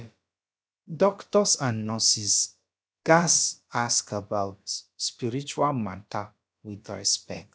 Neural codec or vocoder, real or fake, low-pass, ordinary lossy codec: codec, 16 kHz, about 1 kbps, DyCAST, with the encoder's durations; fake; none; none